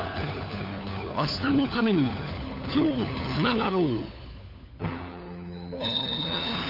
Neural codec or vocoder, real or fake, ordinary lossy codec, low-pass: codec, 16 kHz, 4 kbps, FunCodec, trained on LibriTTS, 50 frames a second; fake; none; 5.4 kHz